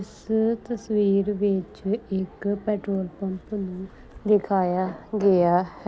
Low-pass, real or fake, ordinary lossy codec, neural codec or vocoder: none; real; none; none